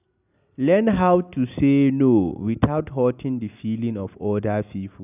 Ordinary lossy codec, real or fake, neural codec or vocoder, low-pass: none; real; none; 3.6 kHz